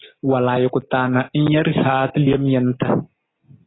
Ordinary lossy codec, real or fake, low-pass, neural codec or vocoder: AAC, 16 kbps; real; 7.2 kHz; none